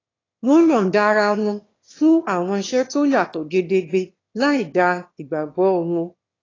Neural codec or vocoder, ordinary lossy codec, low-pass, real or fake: autoencoder, 22.05 kHz, a latent of 192 numbers a frame, VITS, trained on one speaker; AAC, 32 kbps; 7.2 kHz; fake